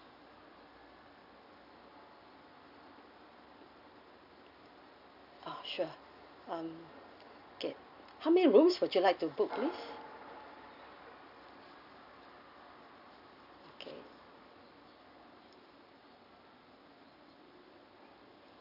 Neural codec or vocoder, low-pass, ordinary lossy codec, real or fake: none; 5.4 kHz; none; real